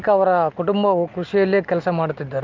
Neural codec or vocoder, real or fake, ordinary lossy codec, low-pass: none; real; Opus, 32 kbps; 7.2 kHz